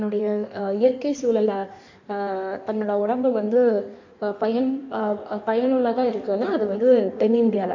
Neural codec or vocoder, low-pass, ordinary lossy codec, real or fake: codec, 16 kHz in and 24 kHz out, 1.1 kbps, FireRedTTS-2 codec; 7.2 kHz; MP3, 48 kbps; fake